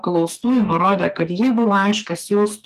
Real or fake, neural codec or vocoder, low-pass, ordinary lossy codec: fake; codec, 44.1 kHz, 2.6 kbps, SNAC; 14.4 kHz; Opus, 32 kbps